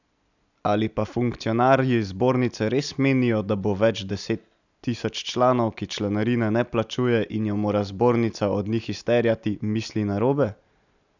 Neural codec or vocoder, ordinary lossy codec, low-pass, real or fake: none; none; 7.2 kHz; real